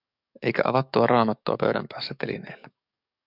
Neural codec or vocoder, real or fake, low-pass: codec, 44.1 kHz, 7.8 kbps, DAC; fake; 5.4 kHz